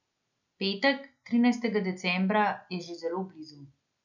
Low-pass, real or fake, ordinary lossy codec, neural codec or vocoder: 7.2 kHz; real; none; none